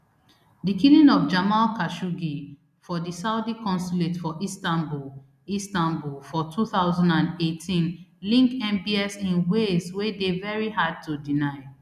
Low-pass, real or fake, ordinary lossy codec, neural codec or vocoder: 14.4 kHz; real; none; none